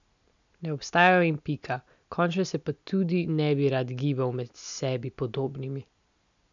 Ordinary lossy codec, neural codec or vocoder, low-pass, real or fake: none; none; 7.2 kHz; real